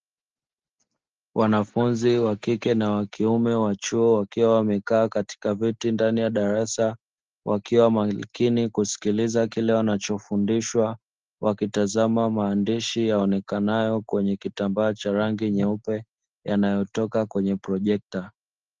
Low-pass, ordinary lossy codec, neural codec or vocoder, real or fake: 7.2 kHz; Opus, 16 kbps; none; real